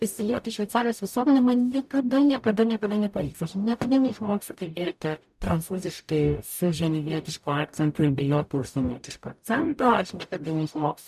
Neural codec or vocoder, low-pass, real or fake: codec, 44.1 kHz, 0.9 kbps, DAC; 14.4 kHz; fake